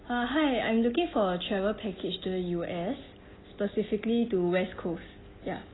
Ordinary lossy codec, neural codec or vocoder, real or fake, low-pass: AAC, 16 kbps; none; real; 7.2 kHz